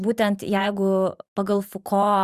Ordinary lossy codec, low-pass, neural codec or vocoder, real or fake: Opus, 64 kbps; 14.4 kHz; vocoder, 44.1 kHz, 128 mel bands every 512 samples, BigVGAN v2; fake